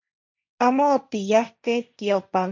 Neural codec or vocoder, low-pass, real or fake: codec, 16 kHz, 1.1 kbps, Voila-Tokenizer; 7.2 kHz; fake